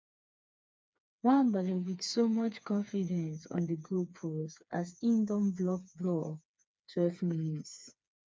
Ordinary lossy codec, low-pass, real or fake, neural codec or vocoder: none; none; fake; codec, 16 kHz, 4 kbps, FreqCodec, smaller model